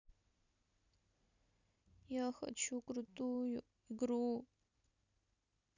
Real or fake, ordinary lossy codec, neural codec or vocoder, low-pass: real; none; none; 7.2 kHz